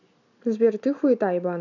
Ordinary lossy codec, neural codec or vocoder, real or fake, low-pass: none; none; real; 7.2 kHz